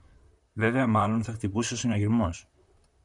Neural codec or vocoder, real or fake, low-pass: vocoder, 44.1 kHz, 128 mel bands, Pupu-Vocoder; fake; 10.8 kHz